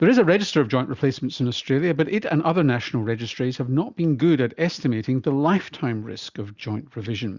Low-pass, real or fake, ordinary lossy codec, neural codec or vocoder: 7.2 kHz; real; Opus, 64 kbps; none